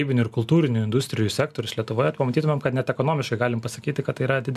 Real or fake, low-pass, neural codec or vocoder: real; 14.4 kHz; none